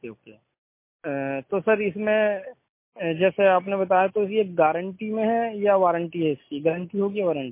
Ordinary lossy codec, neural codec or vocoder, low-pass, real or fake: MP3, 24 kbps; none; 3.6 kHz; real